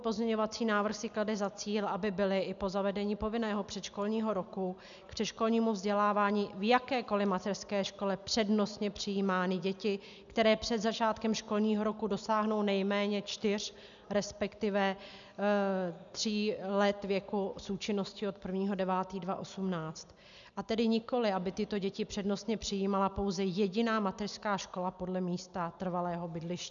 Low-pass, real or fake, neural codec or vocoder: 7.2 kHz; real; none